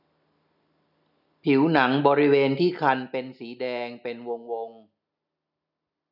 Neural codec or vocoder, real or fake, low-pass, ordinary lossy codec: none; real; 5.4 kHz; none